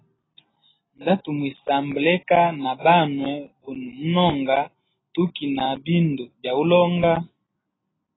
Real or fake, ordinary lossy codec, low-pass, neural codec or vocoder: real; AAC, 16 kbps; 7.2 kHz; none